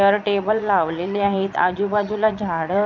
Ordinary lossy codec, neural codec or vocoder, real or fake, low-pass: none; vocoder, 22.05 kHz, 80 mel bands, Vocos; fake; 7.2 kHz